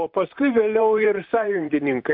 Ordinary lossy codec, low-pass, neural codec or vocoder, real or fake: AAC, 48 kbps; 5.4 kHz; vocoder, 44.1 kHz, 128 mel bands, Pupu-Vocoder; fake